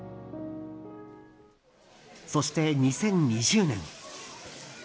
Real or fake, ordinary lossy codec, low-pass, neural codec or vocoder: real; none; none; none